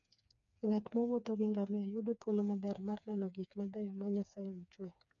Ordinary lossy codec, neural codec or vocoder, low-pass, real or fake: none; codec, 16 kHz, 4 kbps, FreqCodec, smaller model; 7.2 kHz; fake